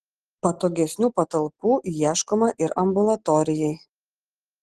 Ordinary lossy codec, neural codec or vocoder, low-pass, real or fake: Opus, 16 kbps; none; 10.8 kHz; real